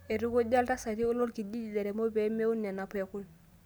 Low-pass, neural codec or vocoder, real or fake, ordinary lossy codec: none; none; real; none